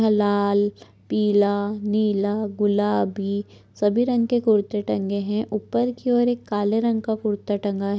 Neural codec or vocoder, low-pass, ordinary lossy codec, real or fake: none; none; none; real